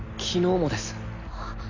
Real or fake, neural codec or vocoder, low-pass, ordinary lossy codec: real; none; 7.2 kHz; none